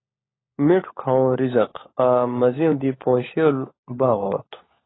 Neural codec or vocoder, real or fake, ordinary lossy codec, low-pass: codec, 16 kHz, 16 kbps, FunCodec, trained on LibriTTS, 50 frames a second; fake; AAC, 16 kbps; 7.2 kHz